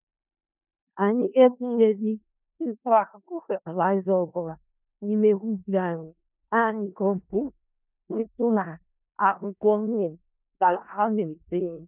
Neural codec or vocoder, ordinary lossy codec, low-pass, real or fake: codec, 16 kHz in and 24 kHz out, 0.4 kbps, LongCat-Audio-Codec, four codebook decoder; none; 3.6 kHz; fake